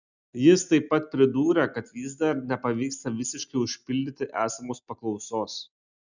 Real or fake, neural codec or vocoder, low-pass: real; none; 7.2 kHz